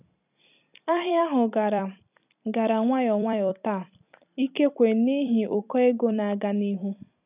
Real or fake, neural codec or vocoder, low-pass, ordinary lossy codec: fake; vocoder, 44.1 kHz, 128 mel bands every 256 samples, BigVGAN v2; 3.6 kHz; none